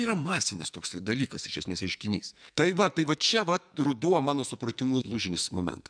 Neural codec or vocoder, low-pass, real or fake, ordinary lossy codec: codec, 44.1 kHz, 2.6 kbps, SNAC; 9.9 kHz; fake; MP3, 96 kbps